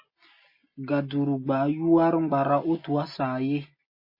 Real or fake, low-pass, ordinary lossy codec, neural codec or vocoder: real; 5.4 kHz; MP3, 24 kbps; none